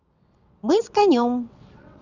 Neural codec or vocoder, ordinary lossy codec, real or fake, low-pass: vocoder, 22.05 kHz, 80 mel bands, WaveNeXt; none; fake; 7.2 kHz